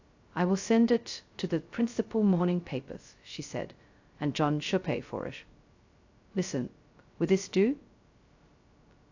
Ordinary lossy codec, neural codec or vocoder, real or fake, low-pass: AAC, 48 kbps; codec, 16 kHz, 0.2 kbps, FocalCodec; fake; 7.2 kHz